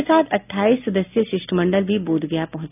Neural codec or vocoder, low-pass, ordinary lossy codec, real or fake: none; 3.6 kHz; none; real